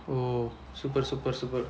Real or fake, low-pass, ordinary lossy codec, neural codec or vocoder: real; none; none; none